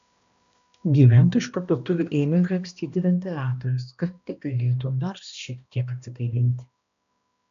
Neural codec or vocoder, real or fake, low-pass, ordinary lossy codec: codec, 16 kHz, 1 kbps, X-Codec, HuBERT features, trained on balanced general audio; fake; 7.2 kHz; MP3, 64 kbps